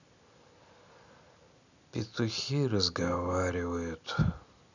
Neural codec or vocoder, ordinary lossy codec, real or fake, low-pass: none; none; real; 7.2 kHz